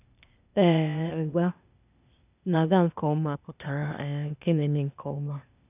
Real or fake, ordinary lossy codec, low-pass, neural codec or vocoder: fake; none; 3.6 kHz; codec, 16 kHz, 0.8 kbps, ZipCodec